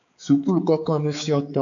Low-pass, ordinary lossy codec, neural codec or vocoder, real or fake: 7.2 kHz; AAC, 64 kbps; codec, 16 kHz, 4 kbps, X-Codec, HuBERT features, trained on balanced general audio; fake